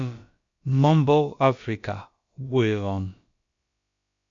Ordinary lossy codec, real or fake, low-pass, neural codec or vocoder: MP3, 48 kbps; fake; 7.2 kHz; codec, 16 kHz, about 1 kbps, DyCAST, with the encoder's durations